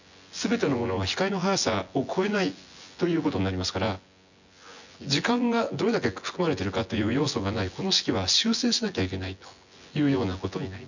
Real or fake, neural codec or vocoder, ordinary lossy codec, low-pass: fake; vocoder, 24 kHz, 100 mel bands, Vocos; none; 7.2 kHz